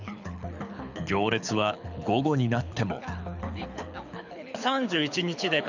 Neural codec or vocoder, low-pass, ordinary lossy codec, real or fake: codec, 24 kHz, 6 kbps, HILCodec; 7.2 kHz; none; fake